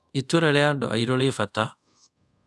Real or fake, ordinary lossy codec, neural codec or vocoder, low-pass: fake; none; codec, 24 kHz, 0.5 kbps, DualCodec; none